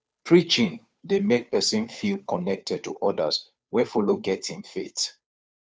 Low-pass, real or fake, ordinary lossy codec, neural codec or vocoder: none; fake; none; codec, 16 kHz, 2 kbps, FunCodec, trained on Chinese and English, 25 frames a second